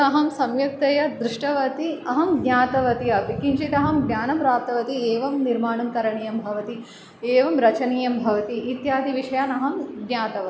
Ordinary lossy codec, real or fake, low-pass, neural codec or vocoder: none; real; none; none